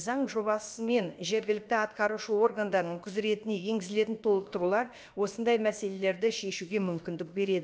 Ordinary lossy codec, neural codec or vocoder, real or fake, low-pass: none; codec, 16 kHz, about 1 kbps, DyCAST, with the encoder's durations; fake; none